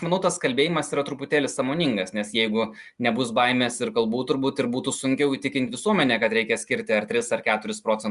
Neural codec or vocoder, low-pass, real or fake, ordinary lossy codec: none; 10.8 kHz; real; Opus, 64 kbps